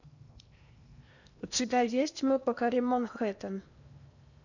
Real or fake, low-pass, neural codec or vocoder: fake; 7.2 kHz; codec, 16 kHz in and 24 kHz out, 0.8 kbps, FocalCodec, streaming, 65536 codes